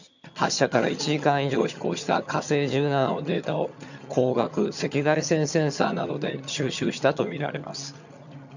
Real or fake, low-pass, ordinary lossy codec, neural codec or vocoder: fake; 7.2 kHz; none; vocoder, 22.05 kHz, 80 mel bands, HiFi-GAN